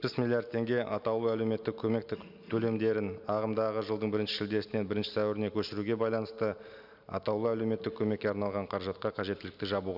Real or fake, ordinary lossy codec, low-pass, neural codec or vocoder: real; none; 5.4 kHz; none